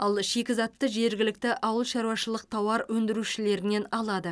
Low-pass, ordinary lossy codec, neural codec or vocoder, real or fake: none; none; none; real